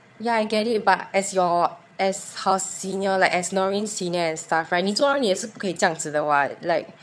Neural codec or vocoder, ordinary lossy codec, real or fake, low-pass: vocoder, 22.05 kHz, 80 mel bands, HiFi-GAN; none; fake; none